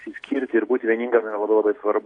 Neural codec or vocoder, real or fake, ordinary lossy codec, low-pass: none; real; AAC, 48 kbps; 10.8 kHz